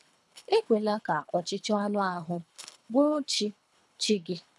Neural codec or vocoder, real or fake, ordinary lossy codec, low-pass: codec, 24 kHz, 3 kbps, HILCodec; fake; none; none